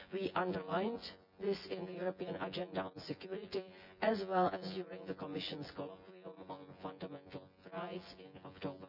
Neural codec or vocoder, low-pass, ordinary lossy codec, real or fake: vocoder, 24 kHz, 100 mel bands, Vocos; 5.4 kHz; none; fake